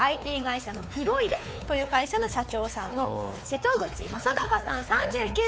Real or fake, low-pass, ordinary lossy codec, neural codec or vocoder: fake; none; none; codec, 16 kHz, 4 kbps, X-Codec, WavLM features, trained on Multilingual LibriSpeech